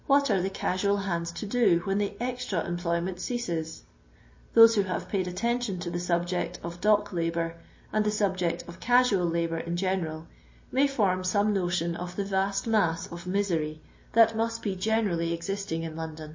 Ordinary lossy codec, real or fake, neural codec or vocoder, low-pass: MP3, 32 kbps; real; none; 7.2 kHz